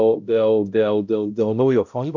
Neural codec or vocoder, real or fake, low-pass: codec, 16 kHz, 0.5 kbps, X-Codec, HuBERT features, trained on balanced general audio; fake; 7.2 kHz